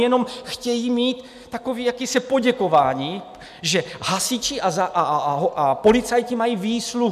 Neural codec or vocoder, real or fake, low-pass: none; real; 14.4 kHz